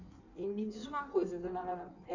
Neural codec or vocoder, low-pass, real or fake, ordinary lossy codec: codec, 16 kHz in and 24 kHz out, 1.1 kbps, FireRedTTS-2 codec; 7.2 kHz; fake; none